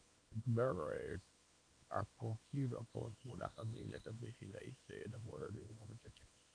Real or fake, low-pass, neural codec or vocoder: fake; 9.9 kHz; codec, 24 kHz, 0.9 kbps, WavTokenizer, small release